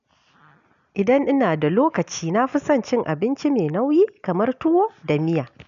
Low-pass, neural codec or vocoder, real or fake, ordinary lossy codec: 7.2 kHz; none; real; none